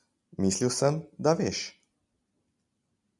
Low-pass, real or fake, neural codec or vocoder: 10.8 kHz; real; none